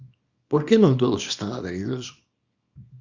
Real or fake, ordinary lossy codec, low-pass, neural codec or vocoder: fake; Opus, 64 kbps; 7.2 kHz; codec, 24 kHz, 0.9 kbps, WavTokenizer, small release